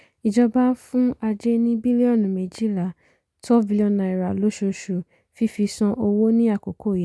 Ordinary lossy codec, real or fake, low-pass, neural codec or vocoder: none; real; none; none